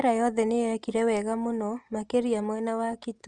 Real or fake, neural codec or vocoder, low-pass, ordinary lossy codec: real; none; 9.9 kHz; Opus, 24 kbps